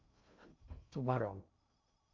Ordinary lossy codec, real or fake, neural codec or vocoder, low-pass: MP3, 48 kbps; fake; codec, 16 kHz in and 24 kHz out, 0.6 kbps, FocalCodec, streaming, 2048 codes; 7.2 kHz